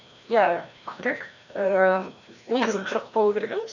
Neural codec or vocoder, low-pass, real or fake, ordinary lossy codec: codec, 16 kHz, 1 kbps, FreqCodec, larger model; 7.2 kHz; fake; none